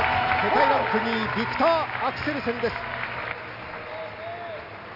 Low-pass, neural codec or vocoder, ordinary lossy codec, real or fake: 5.4 kHz; none; none; real